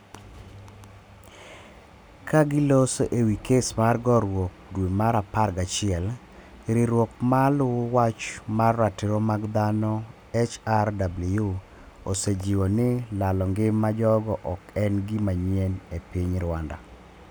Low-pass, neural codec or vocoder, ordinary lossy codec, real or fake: none; none; none; real